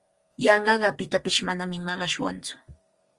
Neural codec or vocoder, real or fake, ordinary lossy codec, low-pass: codec, 32 kHz, 1.9 kbps, SNAC; fake; Opus, 32 kbps; 10.8 kHz